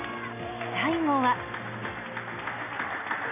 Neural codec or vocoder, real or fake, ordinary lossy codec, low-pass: none; real; none; 3.6 kHz